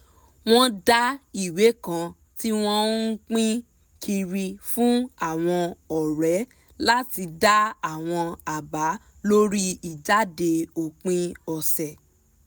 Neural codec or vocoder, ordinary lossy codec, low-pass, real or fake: none; none; none; real